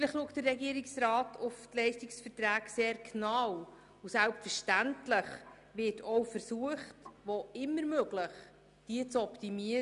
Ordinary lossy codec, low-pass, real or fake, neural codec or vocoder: none; 10.8 kHz; real; none